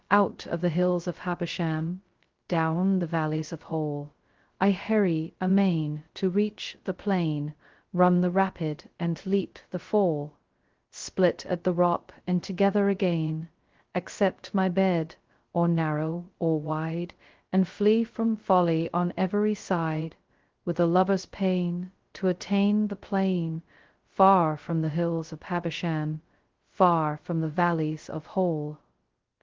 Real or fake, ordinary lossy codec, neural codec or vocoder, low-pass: fake; Opus, 16 kbps; codec, 16 kHz, 0.2 kbps, FocalCodec; 7.2 kHz